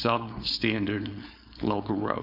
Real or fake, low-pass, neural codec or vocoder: fake; 5.4 kHz; codec, 16 kHz, 4.8 kbps, FACodec